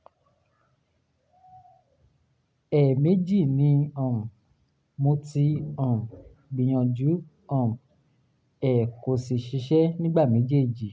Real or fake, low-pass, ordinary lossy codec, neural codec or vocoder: real; none; none; none